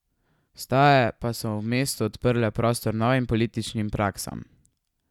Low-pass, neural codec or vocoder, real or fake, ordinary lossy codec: 19.8 kHz; none; real; none